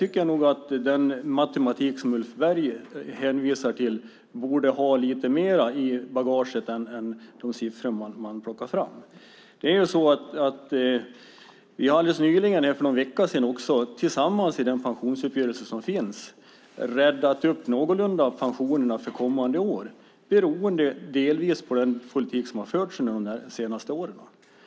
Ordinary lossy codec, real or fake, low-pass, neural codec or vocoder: none; real; none; none